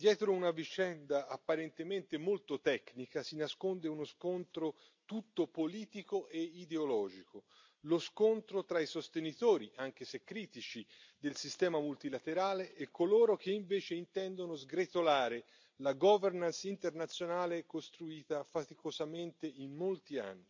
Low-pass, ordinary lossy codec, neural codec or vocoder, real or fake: 7.2 kHz; MP3, 64 kbps; none; real